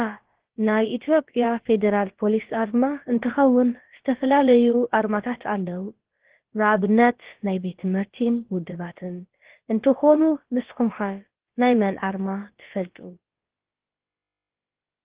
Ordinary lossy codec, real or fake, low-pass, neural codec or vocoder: Opus, 16 kbps; fake; 3.6 kHz; codec, 16 kHz, about 1 kbps, DyCAST, with the encoder's durations